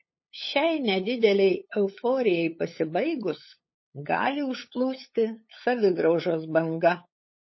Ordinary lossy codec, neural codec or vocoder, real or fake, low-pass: MP3, 24 kbps; codec, 16 kHz, 8 kbps, FunCodec, trained on LibriTTS, 25 frames a second; fake; 7.2 kHz